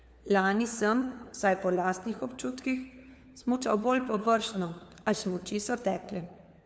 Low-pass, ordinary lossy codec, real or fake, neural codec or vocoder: none; none; fake; codec, 16 kHz, 4 kbps, FunCodec, trained on LibriTTS, 50 frames a second